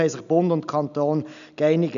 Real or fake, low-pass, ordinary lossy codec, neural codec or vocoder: real; 7.2 kHz; none; none